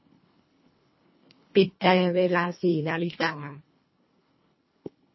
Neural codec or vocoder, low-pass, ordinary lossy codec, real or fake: codec, 24 kHz, 1.5 kbps, HILCodec; 7.2 kHz; MP3, 24 kbps; fake